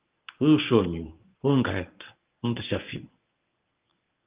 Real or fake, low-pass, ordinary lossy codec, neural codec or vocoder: fake; 3.6 kHz; Opus, 24 kbps; codec, 24 kHz, 0.9 kbps, WavTokenizer, medium speech release version 1